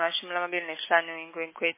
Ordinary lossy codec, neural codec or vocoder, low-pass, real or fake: MP3, 16 kbps; none; 3.6 kHz; real